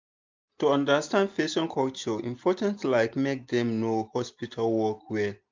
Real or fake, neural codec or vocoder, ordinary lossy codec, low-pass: real; none; MP3, 64 kbps; 7.2 kHz